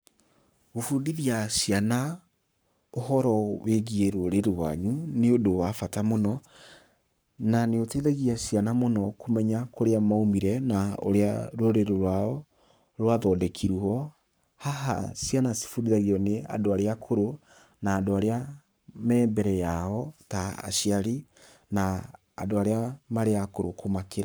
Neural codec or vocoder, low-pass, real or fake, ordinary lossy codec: codec, 44.1 kHz, 7.8 kbps, Pupu-Codec; none; fake; none